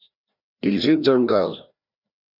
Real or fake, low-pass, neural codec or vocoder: fake; 5.4 kHz; codec, 16 kHz, 1 kbps, FreqCodec, larger model